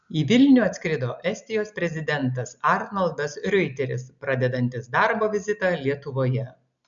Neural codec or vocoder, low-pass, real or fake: none; 7.2 kHz; real